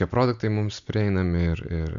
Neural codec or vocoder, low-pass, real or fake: none; 7.2 kHz; real